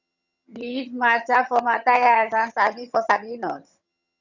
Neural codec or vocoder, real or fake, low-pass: vocoder, 22.05 kHz, 80 mel bands, HiFi-GAN; fake; 7.2 kHz